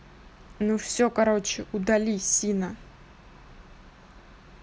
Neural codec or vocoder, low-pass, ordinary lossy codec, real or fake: none; none; none; real